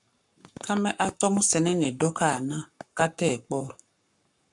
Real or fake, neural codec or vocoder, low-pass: fake; codec, 44.1 kHz, 7.8 kbps, Pupu-Codec; 10.8 kHz